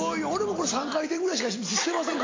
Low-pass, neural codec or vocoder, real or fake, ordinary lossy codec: 7.2 kHz; none; real; AAC, 32 kbps